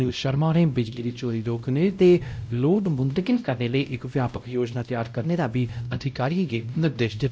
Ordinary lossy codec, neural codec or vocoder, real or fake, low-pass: none; codec, 16 kHz, 0.5 kbps, X-Codec, WavLM features, trained on Multilingual LibriSpeech; fake; none